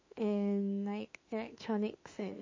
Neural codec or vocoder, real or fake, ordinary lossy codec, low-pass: autoencoder, 48 kHz, 32 numbers a frame, DAC-VAE, trained on Japanese speech; fake; MP3, 32 kbps; 7.2 kHz